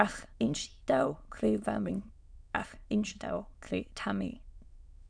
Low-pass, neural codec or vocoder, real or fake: 9.9 kHz; autoencoder, 22.05 kHz, a latent of 192 numbers a frame, VITS, trained on many speakers; fake